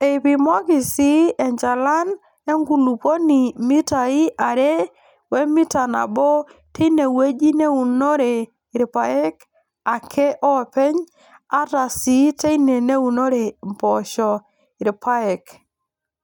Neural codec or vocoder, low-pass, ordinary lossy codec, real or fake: none; 19.8 kHz; none; real